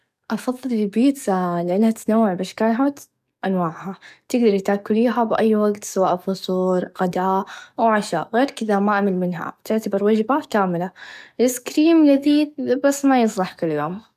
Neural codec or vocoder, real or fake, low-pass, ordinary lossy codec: codec, 44.1 kHz, 7.8 kbps, DAC; fake; 14.4 kHz; none